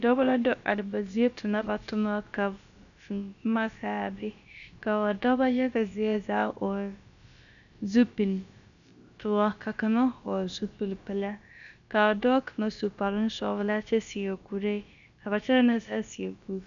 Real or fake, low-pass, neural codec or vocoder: fake; 7.2 kHz; codec, 16 kHz, about 1 kbps, DyCAST, with the encoder's durations